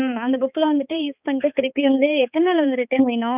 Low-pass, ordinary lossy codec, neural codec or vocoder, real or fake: 3.6 kHz; none; codec, 16 kHz, 4 kbps, X-Codec, HuBERT features, trained on balanced general audio; fake